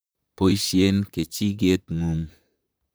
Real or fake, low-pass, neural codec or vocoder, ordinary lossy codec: fake; none; vocoder, 44.1 kHz, 128 mel bands, Pupu-Vocoder; none